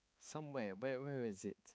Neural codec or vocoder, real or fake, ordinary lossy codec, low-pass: codec, 16 kHz, 4 kbps, X-Codec, HuBERT features, trained on balanced general audio; fake; none; none